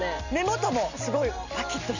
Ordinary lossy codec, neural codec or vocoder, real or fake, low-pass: none; none; real; 7.2 kHz